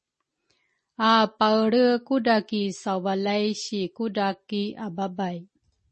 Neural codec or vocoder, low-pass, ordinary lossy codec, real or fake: none; 10.8 kHz; MP3, 32 kbps; real